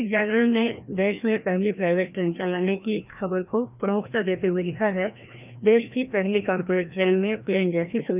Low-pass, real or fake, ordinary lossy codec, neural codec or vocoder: 3.6 kHz; fake; none; codec, 16 kHz, 1 kbps, FreqCodec, larger model